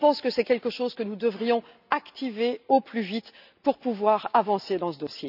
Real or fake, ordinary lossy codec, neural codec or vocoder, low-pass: real; none; none; 5.4 kHz